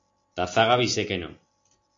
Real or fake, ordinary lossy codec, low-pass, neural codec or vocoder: real; AAC, 48 kbps; 7.2 kHz; none